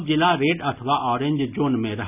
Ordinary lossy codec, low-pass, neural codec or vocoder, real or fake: none; 3.6 kHz; none; real